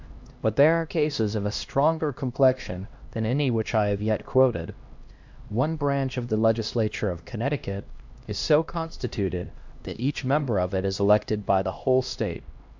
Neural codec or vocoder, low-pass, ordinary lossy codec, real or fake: codec, 16 kHz, 1 kbps, X-Codec, HuBERT features, trained on LibriSpeech; 7.2 kHz; MP3, 64 kbps; fake